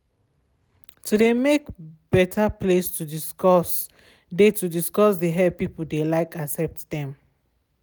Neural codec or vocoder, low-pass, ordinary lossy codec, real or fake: none; none; none; real